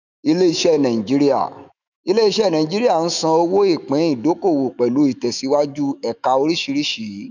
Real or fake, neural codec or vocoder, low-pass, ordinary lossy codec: fake; autoencoder, 48 kHz, 128 numbers a frame, DAC-VAE, trained on Japanese speech; 7.2 kHz; none